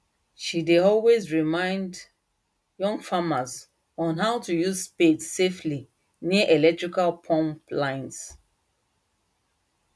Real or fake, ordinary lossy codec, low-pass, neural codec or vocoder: real; none; none; none